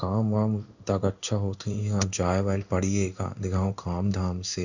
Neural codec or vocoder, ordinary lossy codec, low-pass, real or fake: codec, 16 kHz in and 24 kHz out, 1 kbps, XY-Tokenizer; none; 7.2 kHz; fake